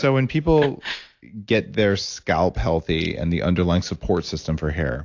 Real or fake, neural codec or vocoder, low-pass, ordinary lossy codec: real; none; 7.2 kHz; AAC, 48 kbps